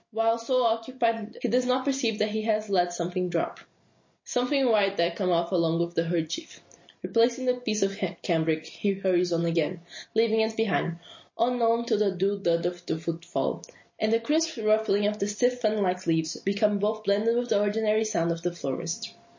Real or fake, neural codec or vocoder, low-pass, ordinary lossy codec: real; none; 7.2 kHz; MP3, 32 kbps